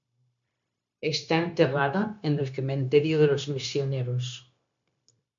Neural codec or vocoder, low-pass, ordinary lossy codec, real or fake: codec, 16 kHz, 0.9 kbps, LongCat-Audio-Codec; 7.2 kHz; MP3, 64 kbps; fake